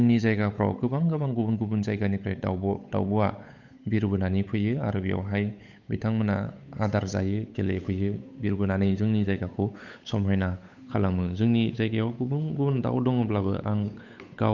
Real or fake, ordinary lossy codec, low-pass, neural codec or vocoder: fake; none; 7.2 kHz; codec, 16 kHz, 8 kbps, FunCodec, trained on Chinese and English, 25 frames a second